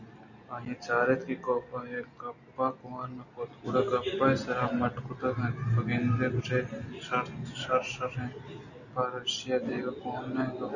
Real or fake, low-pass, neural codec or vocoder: real; 7.2 kHz; none